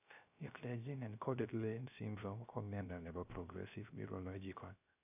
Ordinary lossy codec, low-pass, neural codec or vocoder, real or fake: none; 3.6 kHz; codec, 16 kHz, 0.3 kbps, FocalCodec; fake